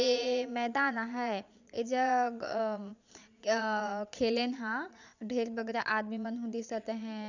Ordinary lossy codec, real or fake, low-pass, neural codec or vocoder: none; fake; 7.2 kHz; vocoder, 22.05 kHz, 80 mel bands, Vocos